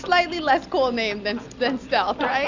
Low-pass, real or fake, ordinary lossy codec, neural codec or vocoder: 7.2 kHz; real; Opus, 64 kbps; none